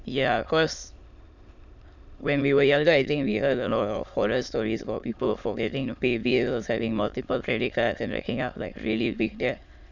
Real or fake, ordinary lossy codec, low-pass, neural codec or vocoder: fake; none; 7.2 kHz; autoencoder, 22.05 kHz, a latent of 192 numbers a frame, VITS, trained on many speakers